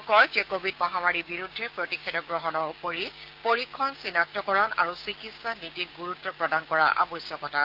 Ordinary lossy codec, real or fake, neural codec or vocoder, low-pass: Opus, 24 kbps; fake; codec, 16 kHz, 6 kbps, DAC; 5.4 kHz